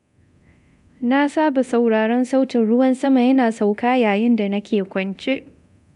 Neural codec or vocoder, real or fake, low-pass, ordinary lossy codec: codec, 24 kHz, 0.9 kbps, DualCodec; fake; 10.8 kHz; none